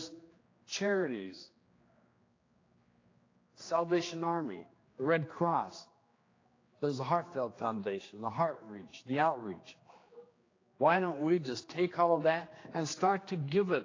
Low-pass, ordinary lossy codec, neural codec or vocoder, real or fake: 7.2 kHz; AAC, 32 kbps; codec, 16 kHz, 2 kbps, X-Codec, HuBERT features, trained on general audio; fake